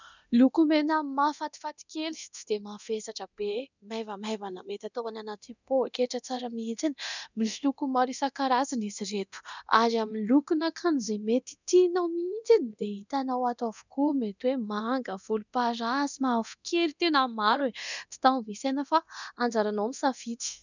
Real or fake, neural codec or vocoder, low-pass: fake; codec, 24 kHz, 0.9 kbps, DualCodec; 7.2 kHz